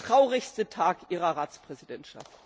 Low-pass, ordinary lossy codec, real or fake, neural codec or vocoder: none; none; real; none